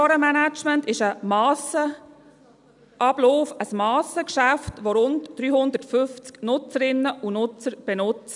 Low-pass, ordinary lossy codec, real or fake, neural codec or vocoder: 10.8 kHz; none; real; none